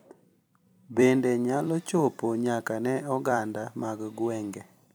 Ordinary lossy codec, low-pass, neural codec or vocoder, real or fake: none; none; vocoder, 44.1 kHz, 128 mel bands every 256 samples, BigVGAN v2; fake